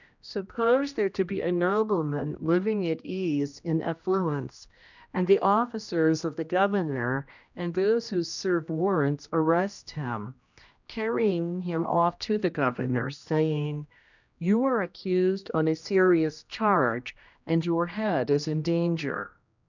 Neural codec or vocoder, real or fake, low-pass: codec, 16 kHz, 1 kbps, X-Codec, HuBERT features, trained on general audio; fake; 7.2 kHz